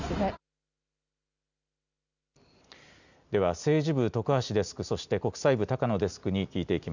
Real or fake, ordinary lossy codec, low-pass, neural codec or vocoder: real; none; 7.2 kHz; none